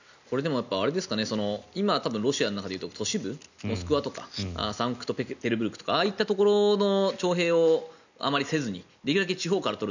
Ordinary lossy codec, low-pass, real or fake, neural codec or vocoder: none; 7.2 kHz; real; none